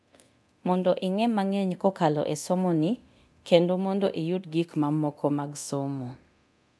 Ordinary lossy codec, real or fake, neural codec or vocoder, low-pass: none; fake; codec, 24 kHz, 0.9 kbps, DualCodec; none